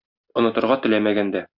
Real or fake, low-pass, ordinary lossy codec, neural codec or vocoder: real; 5.4 kHz; Opus, 64 kbps; none